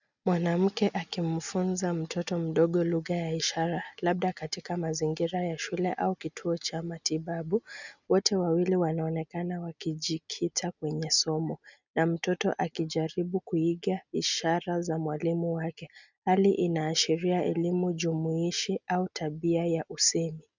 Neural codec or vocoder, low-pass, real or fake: none; 7.2 kHz; real